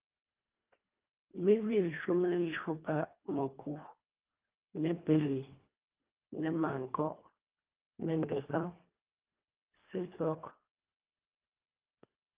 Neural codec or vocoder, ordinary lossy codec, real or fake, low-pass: codec, 24 kHz, 1.5 kbps, HILCodec; Opus, 32 kbps; fake; 3.6 kHz